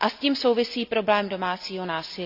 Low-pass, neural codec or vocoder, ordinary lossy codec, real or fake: 5.4 kHz; none; none; real